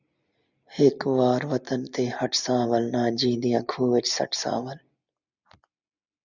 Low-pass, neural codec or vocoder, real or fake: 7.2 kHz; none; real